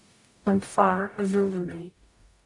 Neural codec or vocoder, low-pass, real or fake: codec, 44.1 kHz, 0.9 kbps, DAC; 10.8 kHz; fake